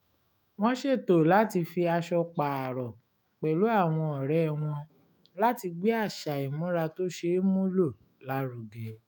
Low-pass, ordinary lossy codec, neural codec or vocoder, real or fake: none; none; autoencoder, 48 kHz, 128 numbers a frame, DAC-VAE, trained on Japanese speech; fake